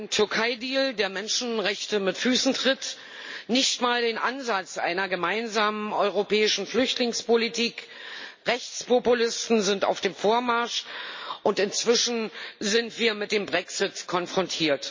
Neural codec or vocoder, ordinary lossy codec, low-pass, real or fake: none; MP3, 32 kbps; 7.2 kHz; real